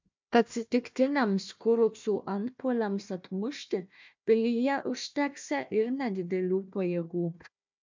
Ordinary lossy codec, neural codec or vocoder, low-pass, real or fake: MP3, 64 kbps; codec, 16 kHz, 1 kbps, FunCodec, trained on Chinese and English, 50 frames a second; 7.2 kHz; fake